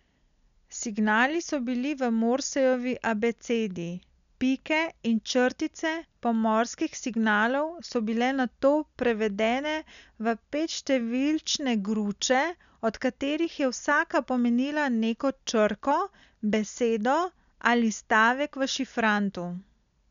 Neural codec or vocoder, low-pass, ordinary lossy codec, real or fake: none; 7.2 kHz; none; real